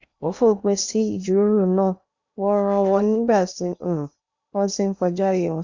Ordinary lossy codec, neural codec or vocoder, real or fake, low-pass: Opus, 64 kbps; codec, 16 kHz in and 24 kHz out, 0.8 kbps, FocalCodec, streaming, 65536 codes; fake; 7.2 kHz